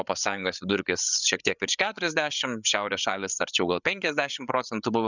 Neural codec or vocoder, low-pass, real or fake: codec, 16 kHz, 8 kbps, FreqCodec, larger model; 7.2 kHz; fake